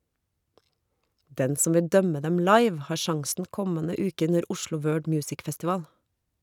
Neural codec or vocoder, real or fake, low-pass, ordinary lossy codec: none; real; 19.8 kHz; none